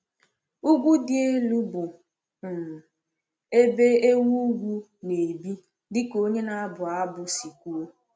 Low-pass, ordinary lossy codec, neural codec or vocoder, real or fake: none; none; none; real